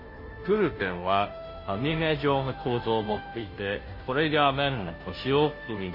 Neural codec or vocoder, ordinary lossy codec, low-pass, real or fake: codec, 16 kHz, 0.5 kbps, FunCodec, trained on Chinese and English, 25 frames a second; MP3, 24 kbps; 5.4 kHz; fake